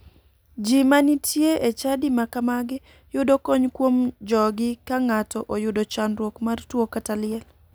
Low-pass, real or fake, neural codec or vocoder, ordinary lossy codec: none; real; none; none